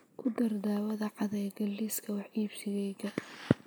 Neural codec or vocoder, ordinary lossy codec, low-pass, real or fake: none; none; none; real